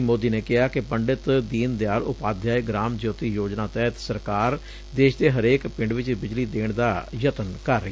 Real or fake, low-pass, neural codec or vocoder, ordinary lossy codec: real; none; none; none